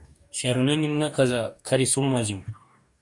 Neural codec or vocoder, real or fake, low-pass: codec, 44.1 kHz, 2.6 kbps, DAC; fake; 10.8 kHz